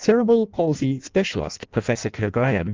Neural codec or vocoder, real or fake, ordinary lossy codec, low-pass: codec, 16 kHz in and 24 kHz out, 0.6 kbps, FireRedTTS-2 codec; fake; Opus, 24 kbps; 7.2 kHz